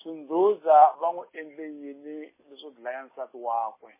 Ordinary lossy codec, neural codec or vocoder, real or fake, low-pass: MP3, 16 kbps; none; real; 3.6 kHz